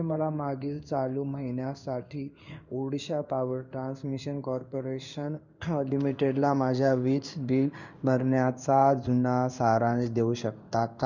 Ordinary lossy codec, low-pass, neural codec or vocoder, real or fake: none; 7.2 kHz; codec, 16 kHz in and 24 kHz out, 1 kbps, XY-Tokenizer; fake